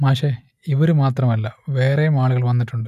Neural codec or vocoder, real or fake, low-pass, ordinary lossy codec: none; real; 14.4 kHz; none